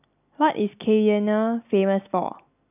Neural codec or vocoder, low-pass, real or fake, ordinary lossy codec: none; 3.6 kHz; real; none